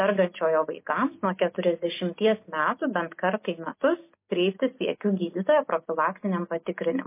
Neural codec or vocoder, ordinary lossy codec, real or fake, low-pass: none; MP3, 24 kbps; real; 3.6 kHz